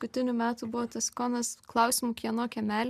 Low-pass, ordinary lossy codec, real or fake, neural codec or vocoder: 14.4 kHz; MP3, 96 kbps; real; none